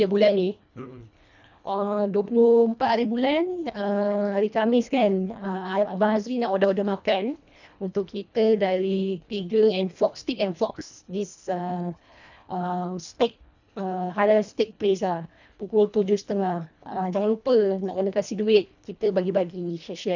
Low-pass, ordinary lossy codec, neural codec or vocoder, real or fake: 7.2 kHz; none; codec, 24 kHz, 1.5 kbps, HILCodec; fake